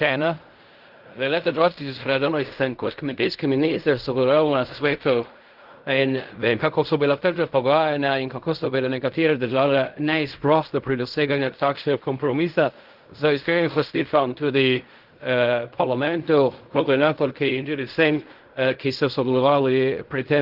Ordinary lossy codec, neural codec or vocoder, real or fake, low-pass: Opus, 32 kbps; codec, 16 kHz in and 24 kHz out, 0.4 kbps, LongCat-Audio-Codec, fine tuned four codebook decoder; fake; 5.4 kHz